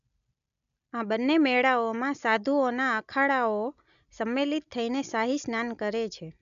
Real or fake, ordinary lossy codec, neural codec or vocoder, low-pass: real; none; none; 7.2 kHz